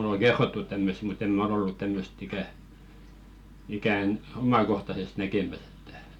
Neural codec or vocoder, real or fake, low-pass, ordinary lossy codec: none; real; 19.8 kHz; none